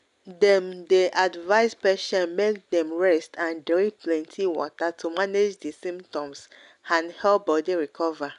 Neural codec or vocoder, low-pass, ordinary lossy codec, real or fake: none; 10.8 kHz; none; real